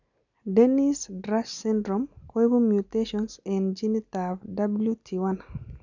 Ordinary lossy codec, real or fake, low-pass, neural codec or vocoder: none; real; 7.2 kHz; none